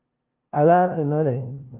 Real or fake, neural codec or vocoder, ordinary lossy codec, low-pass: fake; codec, 16 kHz, 0.5 kbps, FunCodec, trained on LibriTTS, 25 frames a second; Opus, 24 kbps; 3.6 kHz